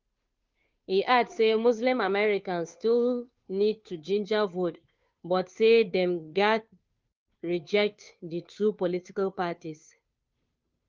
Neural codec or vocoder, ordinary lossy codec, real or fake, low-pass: codec, 16 kHz, 2 kbps, FunCodec, trained on Chinese and English, 25 frames a second; Opus, 24 kbps; fake; 7.2 kHz